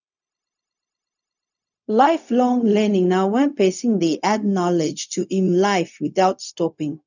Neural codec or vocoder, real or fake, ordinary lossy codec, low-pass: codec, 16 kHz, 0.4 kbps, LongCat-Audio-Codec; fake; none; 7.2 kHz